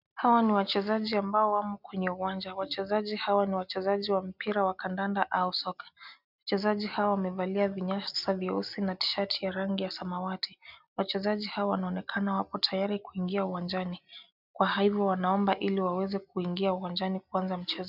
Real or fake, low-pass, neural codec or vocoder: real; 5.4 kHz; none